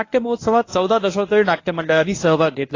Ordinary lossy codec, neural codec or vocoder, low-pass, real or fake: AAC, 32 kbps; codec, 24 kHz, 0.9 kbps, WavTokenizer, medium speech release version 1; 7.2 kHz; fake